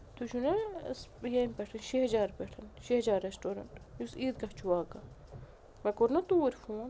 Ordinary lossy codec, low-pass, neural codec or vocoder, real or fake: none; none; none; real